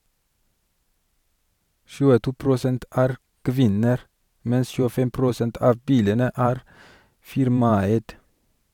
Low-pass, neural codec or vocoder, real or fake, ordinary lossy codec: 19.8 kHz; vocoder, 44.1 kHz, 128 mel bands every 512 samples, BigVGAN v2; fake; none